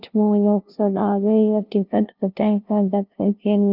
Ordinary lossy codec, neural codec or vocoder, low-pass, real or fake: Opus, 24 kbps; codec, 16 kHz, 0.5 kbps, FunCodec, trained on LibriTTS, 25 frames a second; 5.4 kHz; fake